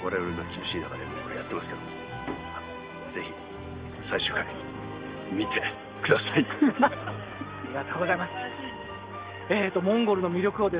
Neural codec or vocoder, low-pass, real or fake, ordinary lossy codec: none; 3.6 kHz; real; Opus, 32 kbps